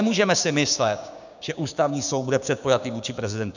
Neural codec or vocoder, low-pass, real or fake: codec, 16 kHz, 6 kbps, DAC; 7.2 kHz; fake